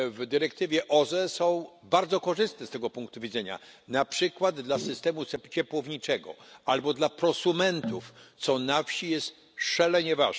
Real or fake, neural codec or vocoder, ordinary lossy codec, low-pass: real; none; none; none